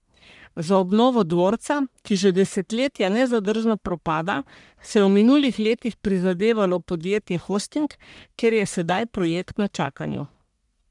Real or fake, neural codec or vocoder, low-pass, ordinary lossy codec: fake; codec, 44.1 kHz, 1.7 kbps, Pupu-Codec; 10.8 kHz; none